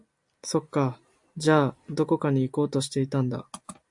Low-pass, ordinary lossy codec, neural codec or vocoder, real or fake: 10.8 kHz; AAC, 64 kbps; none; real